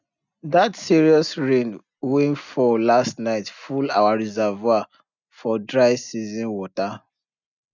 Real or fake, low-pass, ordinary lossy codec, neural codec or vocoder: real; 7.2 kHz; none; none